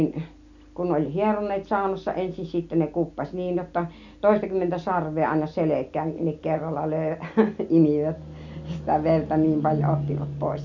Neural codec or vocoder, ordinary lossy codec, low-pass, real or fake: none; none; 7.2 kHz; real